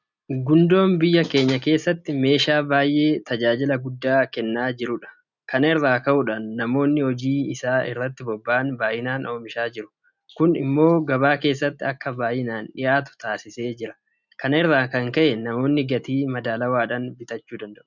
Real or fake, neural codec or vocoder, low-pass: real; none; 7.2 kHz